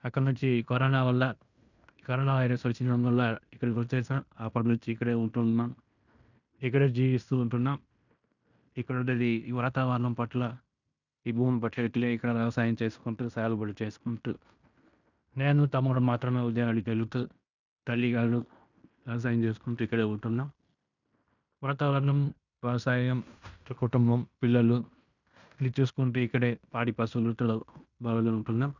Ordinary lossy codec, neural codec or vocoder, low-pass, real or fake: none; codec, 16 kHz in and 24 kHz out, 0.9 kbps, LongCat-Audio-Codec, fine tuned four codebook decoder; 7.2 kHz; fake